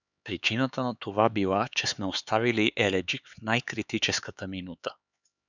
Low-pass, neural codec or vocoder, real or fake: 7.2 kHz; codec, 16 kHz, 4 kbps, X-Codec, HuBERT features, trained on LibriSpeech; fake